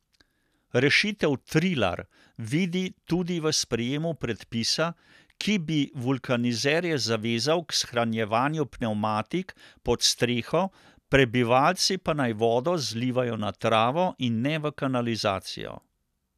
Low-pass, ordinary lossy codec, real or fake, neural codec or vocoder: 14.4 kHz; none; real; none